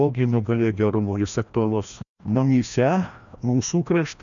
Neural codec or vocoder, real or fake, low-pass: codec, 16 kHz, 1 kbps, FreqCodec, larger model; fake; 7.2 kHz